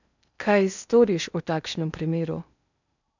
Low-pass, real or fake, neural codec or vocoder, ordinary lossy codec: 7.2 kHz; fake; codec, 16 kHz in and 24 kHz out, 0.6 kbps, FocalCodec, streaming, 4096 codes; none